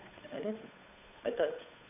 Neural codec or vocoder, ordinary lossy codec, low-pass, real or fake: codec, 16 kHz, 8 kbps, FunCodec, trained on Chinese and English, 25 frames a second; none; 3.6 kHz; fake